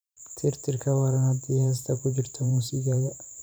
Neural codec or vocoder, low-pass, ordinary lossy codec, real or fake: vocoder, 44.1 kHz, 128 mel bands every 512 samples, BigVGAN v2; none; none; fake